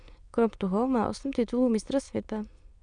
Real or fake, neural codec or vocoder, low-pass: fake; autoencoder, 22.05 kHz, a latent of 192 numbers a frame, VITS, trained on many speakers; 9.9 kHz